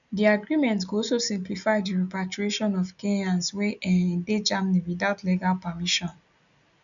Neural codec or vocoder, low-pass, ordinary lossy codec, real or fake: none; 7.2 kHz; none; real